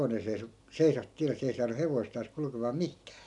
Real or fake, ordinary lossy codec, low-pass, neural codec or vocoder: real; none; 10.8 kHz; none